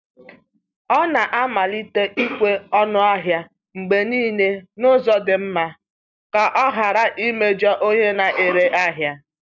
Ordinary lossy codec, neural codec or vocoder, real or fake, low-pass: none; none; real; 7.2 kHz